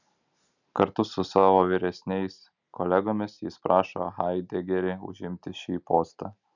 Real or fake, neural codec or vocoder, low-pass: real; none; 7.2 kHz